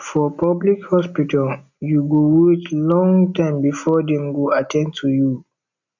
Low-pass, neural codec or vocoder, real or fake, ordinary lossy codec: 7.2 kHz; none; real; none